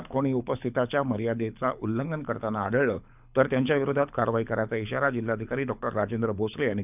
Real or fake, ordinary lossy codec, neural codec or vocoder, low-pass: fake; none; codec, 24 kHz, 6 kbps, HILCodec; 3.6 kHz